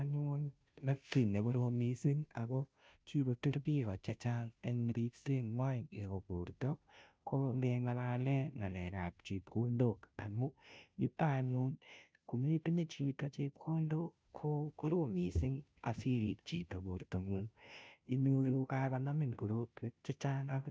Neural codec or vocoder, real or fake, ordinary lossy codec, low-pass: codec, 16 kHz, 0.5 kbps, FunCodec, trained on Chinese and English, 25 frames a second; fake; none; none